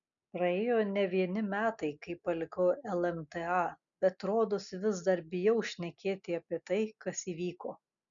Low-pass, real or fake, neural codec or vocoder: 7.2 kHz; real; none